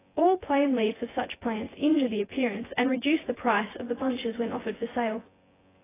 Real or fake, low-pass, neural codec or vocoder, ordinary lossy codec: fake; 3.6 kHz; vocoder, 24 kHz, 100 mel bands, Vocos; AAC, 16 kbps